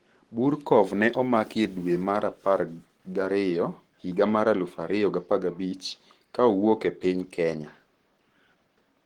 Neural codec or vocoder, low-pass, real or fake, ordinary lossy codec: codec, 44.1 kHz, 7.8 kbps, Pupu-Codec; 19.8 kHz; fake; Opus, 16 kbps